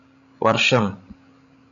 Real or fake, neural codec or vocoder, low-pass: fake; codec, 16 kHz, 16 kbps, FreqCodec, larger model; 7.2 kHz